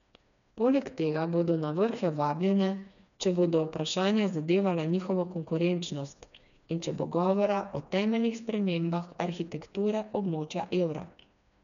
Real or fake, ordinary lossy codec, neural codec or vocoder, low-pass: fake; none; codec, 16 kHz, 2 kbps, FreqCodec, smaller model; 7.2 kHz